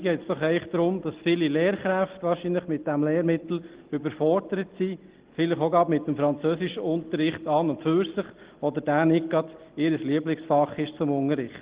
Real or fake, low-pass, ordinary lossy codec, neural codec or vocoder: real; 3.6 kHz; Opus, 16 kbps; none